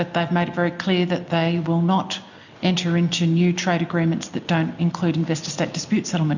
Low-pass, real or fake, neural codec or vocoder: 7.2 kHz; real; none